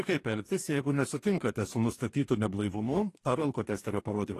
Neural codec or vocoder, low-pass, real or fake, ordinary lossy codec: codec, 44.1 kHz, 2.6 kbps, DAC; 14.4 kHz; fake; AAC, 48 kbps